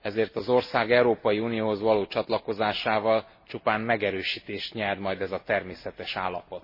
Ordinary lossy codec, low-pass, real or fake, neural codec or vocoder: MP3, 24 kbps; 5.4 kHz; real; none